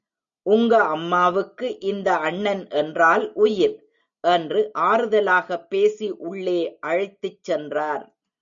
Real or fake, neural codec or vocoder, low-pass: real; none; 7.2 kHz